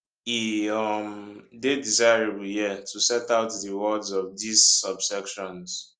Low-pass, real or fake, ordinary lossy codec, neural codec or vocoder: 9.9 kHz; real; Opus, 32 kbps; none